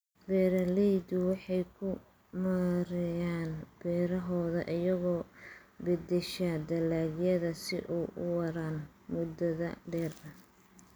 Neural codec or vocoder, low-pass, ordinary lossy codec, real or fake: none; none; none; real